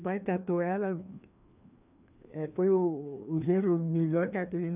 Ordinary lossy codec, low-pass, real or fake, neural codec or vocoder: none; 3.6 kHz; fake; codec, 16 kHz, 2 kbps, FreqCodec, larger model